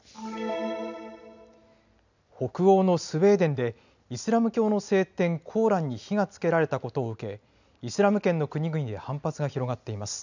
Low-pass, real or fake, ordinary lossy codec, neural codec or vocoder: 7.2 kHz; real; none; none